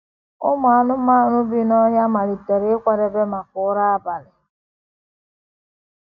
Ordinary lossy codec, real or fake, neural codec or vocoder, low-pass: none; real; none; 7.2 kHz